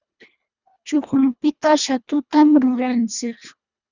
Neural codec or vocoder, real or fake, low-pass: codec, 24 kHz, 1.5 kbps, HILCodec; fake; 7.2 kHz